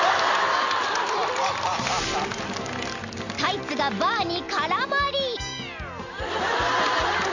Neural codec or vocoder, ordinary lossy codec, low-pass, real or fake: none; none; 7.2 kHz; real